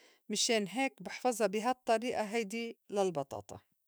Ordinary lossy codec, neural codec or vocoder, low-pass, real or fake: none; autoencoder, 48 kHz, 128 numbers a frame, DAC-VAE, trained on Japanese speech; none; fake